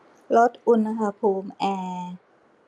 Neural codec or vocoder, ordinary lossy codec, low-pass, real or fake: none; none; none; real